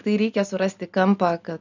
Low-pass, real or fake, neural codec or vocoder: 7.2 kHz; real; none